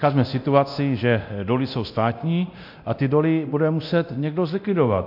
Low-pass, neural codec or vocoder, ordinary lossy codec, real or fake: 5.4 kHz; codec, 24 kHz, 0.9 kbps, DualCodec; MP3, 48 kbps; fake